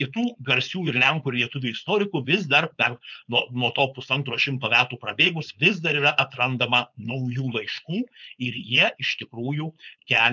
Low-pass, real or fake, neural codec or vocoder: 7.2 kHz; fake; codec, 16 kHz, 4.8 kbps, FACodec